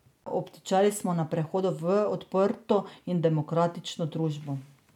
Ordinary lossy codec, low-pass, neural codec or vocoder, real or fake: none; 19.8 kHz; none; real